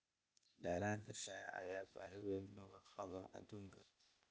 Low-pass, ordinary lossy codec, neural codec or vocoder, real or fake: none; none; codec, 16 kHz, 0.8 kbps, ZipCodec; fake